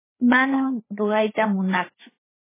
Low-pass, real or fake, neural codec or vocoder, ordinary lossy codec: 3.6 kHz; fake; codec, 16 kHz, 4 kbps, FreqCodec, larger model; MP3, 16 kbps